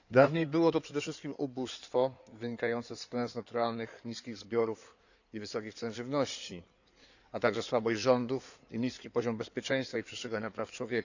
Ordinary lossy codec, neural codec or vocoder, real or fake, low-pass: none; codec, 16 kHz in and 24 kHz out, 2.2 kbps, FireRedTTS-2 codec; fake; 7.2 kHz